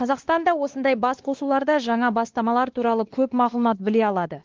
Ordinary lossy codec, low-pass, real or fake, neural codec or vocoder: Opus, 16 kbps; 7.2 kHz; fake; codec, 16 kHz, 2 kbps, X-Codec, WavLM features, trained on Multilingual LibriSpeech